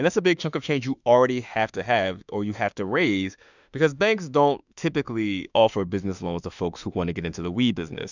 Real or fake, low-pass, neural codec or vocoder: fake; 7.2 kHz; autoencoder, 48 kHz, 32 numbers a frame, DAC-VAE, trained on Japanese speech